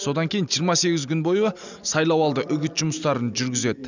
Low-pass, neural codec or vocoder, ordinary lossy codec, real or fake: 7.2 kHz; none; none; real